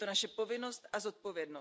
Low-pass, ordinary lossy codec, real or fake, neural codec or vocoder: none; none; real; none